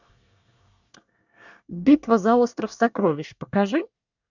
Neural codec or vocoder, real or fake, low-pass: codec, 24 kHz, 1 kbps, SNAC; fake; 7.2 kHz